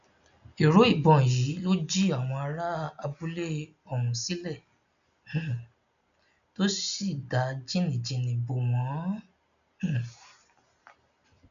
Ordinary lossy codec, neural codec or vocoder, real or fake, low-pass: none; none; real; 7.2 kHz